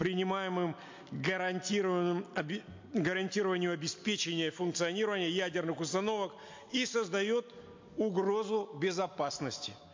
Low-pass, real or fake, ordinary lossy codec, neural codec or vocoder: 7.2 kHz; real; MP3, 48 kbps; none